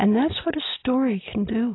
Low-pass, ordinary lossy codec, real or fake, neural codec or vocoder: 7.2 kHz; AAC, 16 kbps; real; none